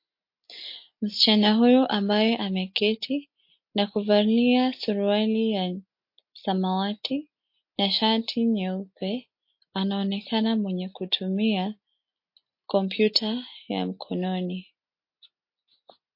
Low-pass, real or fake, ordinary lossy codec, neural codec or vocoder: 5.4 kHz; real; MP3, 32 kbps; none